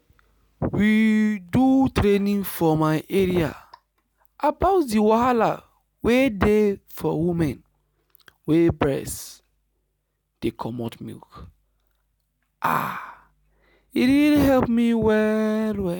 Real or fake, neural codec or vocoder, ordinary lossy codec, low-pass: real; none; none; none